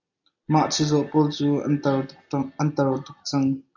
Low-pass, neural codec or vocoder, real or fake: 7.2 kHz; none; real